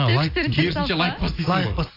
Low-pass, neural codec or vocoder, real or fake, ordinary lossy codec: 5.4 kHz; none; real; AAC, 24 kbps